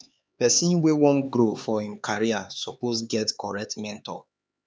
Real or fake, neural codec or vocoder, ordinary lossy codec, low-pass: fake; codec, 16 kHz, 4 kbps, X-Codec, HuBERT features, trained on LibriSpeech; none; none